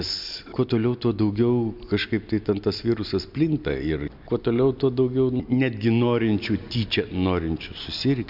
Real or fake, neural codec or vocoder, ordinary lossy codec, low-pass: real; none; MP3, 48 kbps; 5.4 kHz